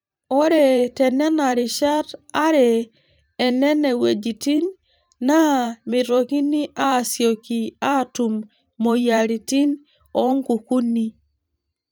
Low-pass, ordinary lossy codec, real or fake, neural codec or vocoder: none; none; fake; vocoder, 44.1 kHz, 128 mel bands every 512 samples, BigVGAN v2